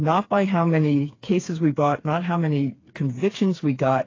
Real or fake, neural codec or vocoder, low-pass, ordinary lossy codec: fake; codec, 16 kHz, 4 kbps, FreqCodec, smaller model; 7.2 kHz; AAC, 32 kbps